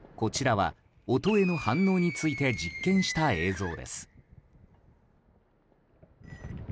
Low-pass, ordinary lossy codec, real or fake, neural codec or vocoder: none; none; real; none